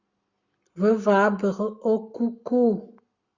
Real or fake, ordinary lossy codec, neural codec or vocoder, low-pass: fake; Opus, 64 kbps; codec, 44.1 kHz, 7.8 kbps, Pupu-Codec; 7.2 kHz